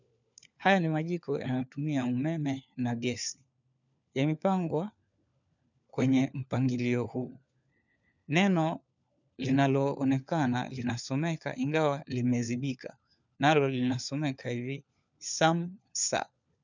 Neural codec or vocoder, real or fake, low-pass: codec, 16 kHz, 4 kbps, FunCodec, trained on LibriTTS, 50 frames a second; fake; 7.2 kHz